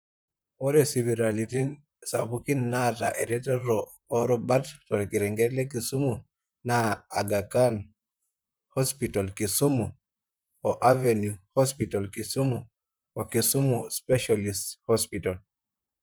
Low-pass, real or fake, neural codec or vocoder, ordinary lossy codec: none; fake; vocoder, 44.1 kHz, 128 mel bands, Pupu-Vocoder; none